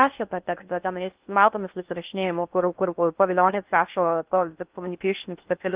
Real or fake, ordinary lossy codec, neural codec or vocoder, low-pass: fake; Opus, 32 kbps; codec, 16 kHz in and 24 kHz out, 0.6 kbps, FocalCodec, streaming, 2048 codes; 3.6 kHz